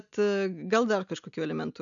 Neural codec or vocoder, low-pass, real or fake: none; 7.2 kHz; real